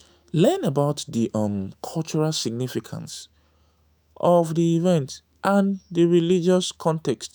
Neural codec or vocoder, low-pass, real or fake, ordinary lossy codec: autoencoder, 48 kHz, 128 numbers a frame, DAC-VAE, trained on Japanese speech; none; fake; none